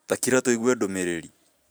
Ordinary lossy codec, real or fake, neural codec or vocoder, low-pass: none; fake; vocoder, 44.1 kHz, 128 mel bands every 512 samples, BigVGAN v2; none